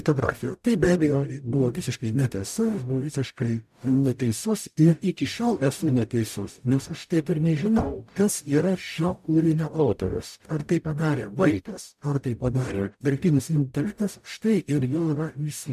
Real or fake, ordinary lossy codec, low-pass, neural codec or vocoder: fake; MP3, 96 kbps; 14.4 kHz; codec, 44.1 kHz, 0.9 kbps, DAC